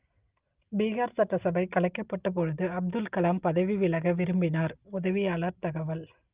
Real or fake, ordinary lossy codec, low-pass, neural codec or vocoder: real; Opus, 24 kbps; 3.6 kHz; none